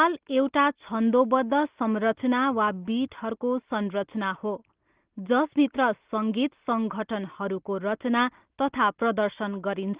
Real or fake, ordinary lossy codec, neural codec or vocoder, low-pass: real; Opus, 16 kbps; none; 3.6 kHz